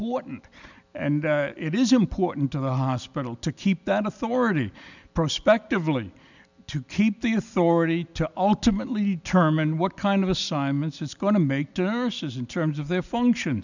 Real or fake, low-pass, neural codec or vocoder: real; 7.2 kHz; none